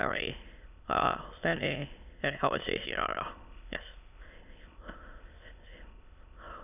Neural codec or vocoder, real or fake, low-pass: autoencoder, 22.05 kHz, a latent of 192 numbers a frame, VITS, trained on many speakers; fake; 3.6 kHz